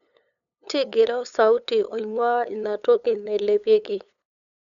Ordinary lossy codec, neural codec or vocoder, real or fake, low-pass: none; codec, 16 kHz, 8 kbps, FunCodec, trained on LibriTTS, 25 frames a second; fake; 7.2 kHz